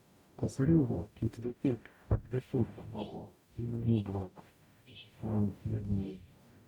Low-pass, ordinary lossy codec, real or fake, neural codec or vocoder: 19.8 kHz; none; fake; codec, 44.1 kHz, 0.9 kbps, DAC